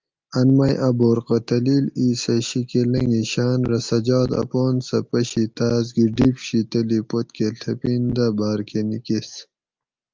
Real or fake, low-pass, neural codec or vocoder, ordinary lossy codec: real; 7.2 kHz; none; Opus, 24 kbps